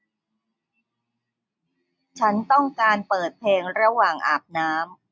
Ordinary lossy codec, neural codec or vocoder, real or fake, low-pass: none; none; real; none